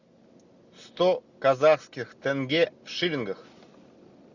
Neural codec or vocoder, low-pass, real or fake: none; 7.2 kHz; real